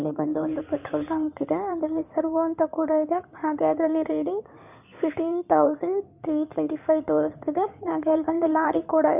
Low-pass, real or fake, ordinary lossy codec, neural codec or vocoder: 3.6 kHz; fake; none; codec, 16 kHz in and 24 kHz out, 2.2 kbps, FireRedTTS-2 codec